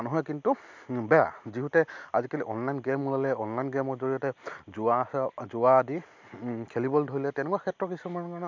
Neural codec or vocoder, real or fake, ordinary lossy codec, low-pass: none; real; MP3, 64 kbps; 7.2 kHz